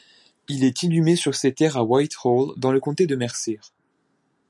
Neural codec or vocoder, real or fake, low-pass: none; real; 10.8 kHz